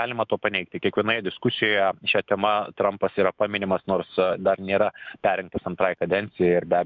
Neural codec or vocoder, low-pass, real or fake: autoencoder, 48 kHz, 128 numbers a frame, DAC-VAE, trained on Japanese speech; 7.2 kHz; fake